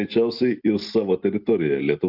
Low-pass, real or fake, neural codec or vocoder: 5.4 kHz; real; none